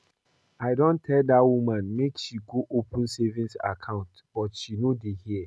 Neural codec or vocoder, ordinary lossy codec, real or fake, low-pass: none; none; real; none